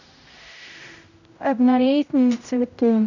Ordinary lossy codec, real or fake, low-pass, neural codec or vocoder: none; fake; 7.2 kHz; codec, 16 kHz, 0.5 kbps, X-Codec, HuBERT features, trained on general audio